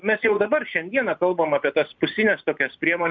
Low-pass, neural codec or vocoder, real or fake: 7.2 kHz; none; real